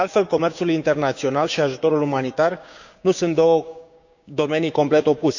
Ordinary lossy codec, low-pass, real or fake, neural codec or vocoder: none; 7.2 kHz; fake; codec, 16 kHz, 6 kbps, DAC